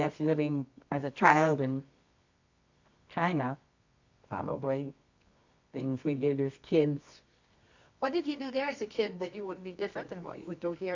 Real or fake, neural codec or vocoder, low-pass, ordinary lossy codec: fake; codec, 24 kHz, 0.9 kbps, WavTokenizer, medium music audio release; 7.2 kHz; AAC, 48 kbps